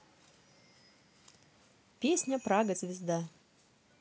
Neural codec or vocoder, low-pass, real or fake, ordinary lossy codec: none; none; real; none